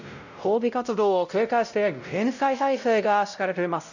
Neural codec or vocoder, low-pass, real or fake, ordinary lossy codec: codec, 16 kHz, 0.5 kbps, X-Codec, WavLM features, trained on Multilingual LibriSpeech; 7.2 kHz; fake; none